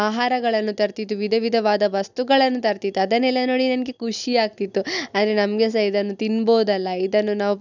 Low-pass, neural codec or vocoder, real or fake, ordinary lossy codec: 7.2 kHz; none; real; none